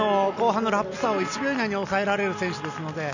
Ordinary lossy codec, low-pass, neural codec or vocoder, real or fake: none; 7.2 kHz; none; real